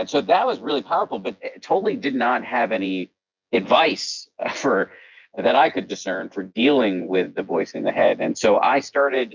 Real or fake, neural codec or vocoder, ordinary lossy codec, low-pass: fake; vocoder, 24 kHz, 100 mel bands, Vocos; MP3, 64 kbps; 7.2 kHz